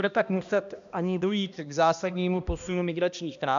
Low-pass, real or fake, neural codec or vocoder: 7.2 kHz; fake; codec, 16 kHz, 1 kbps, X-Codec, HuBERT features, trained on balanced general audio